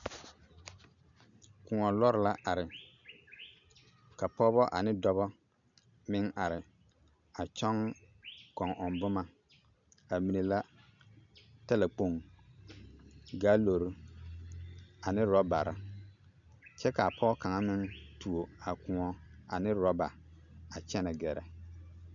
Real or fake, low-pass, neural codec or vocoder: real; 7.2 kHz; none